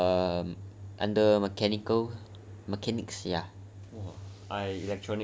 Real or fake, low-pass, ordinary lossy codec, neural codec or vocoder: real; none; none; none